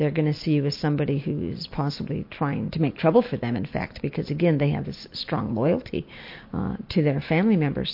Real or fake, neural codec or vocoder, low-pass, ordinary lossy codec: real; none; 5.4 kHz; MP3, 32 kbps